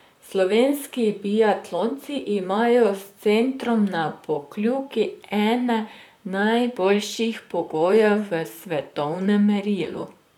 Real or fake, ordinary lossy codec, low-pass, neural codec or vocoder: fake; none; 19.8 kHz; vocoder, 44.1 kHz, 128 mel bands, Pupu-Vocoder